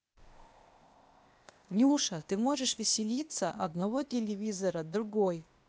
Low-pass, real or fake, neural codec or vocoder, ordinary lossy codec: none; fake; codec, 16 kHz, 0.8 kbps, ZipCodec; none